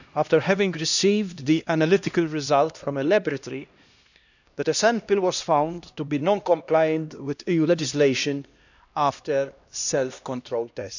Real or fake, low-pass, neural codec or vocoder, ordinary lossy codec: fake; 7.2 kHz; codec, 16 kHz, 1 kbps, X-Codec, HuBERT features, trained on LibriSpeech; none